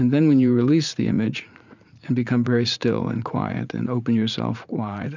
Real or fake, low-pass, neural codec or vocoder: fake; 7.2 kHz; vocoder, 44.1 kHz, 80 mel bands, Vocos